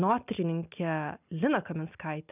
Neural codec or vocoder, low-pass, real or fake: none; 3.6 kHz; real